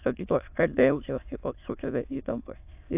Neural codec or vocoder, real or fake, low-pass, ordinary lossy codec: autoencoder, 22.05 kHz, a latent of 192 numbers a frame, VITS, trained on many speakers; fake; 3.6 kHz; AAC, 32 kbps